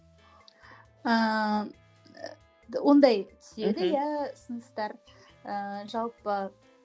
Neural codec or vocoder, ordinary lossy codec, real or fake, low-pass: none; none; real; none